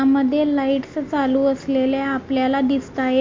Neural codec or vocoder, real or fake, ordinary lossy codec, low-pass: none; real; MP3, 48 kbps; 7.2 kHz